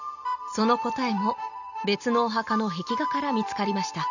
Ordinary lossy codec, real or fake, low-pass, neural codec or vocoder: none; real; 7.2 kHz; none